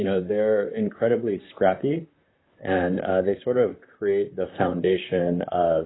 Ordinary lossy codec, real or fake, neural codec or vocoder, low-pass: AAC, 16 kbps; fake; vocoder, 44.1 kHz, 128 mel bands, Pupu-Vocoder; 7.2 kHz